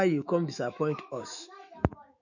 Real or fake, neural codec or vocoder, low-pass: fake; autoencoder, 48 kHz, 128 numbers a frame, DAC-VAE, trained on Japanese speech; 7.2 kHz